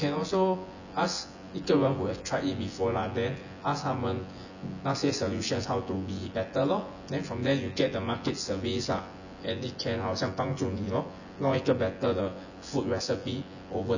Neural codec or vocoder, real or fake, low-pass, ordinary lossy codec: vocoder, 24 kHz, 100 mel bands, Vocos; fake; 7.2 kHz; none